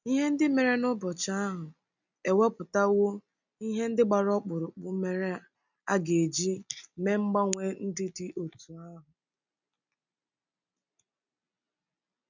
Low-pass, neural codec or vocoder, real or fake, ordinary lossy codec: 7.2 kHz; none; real; none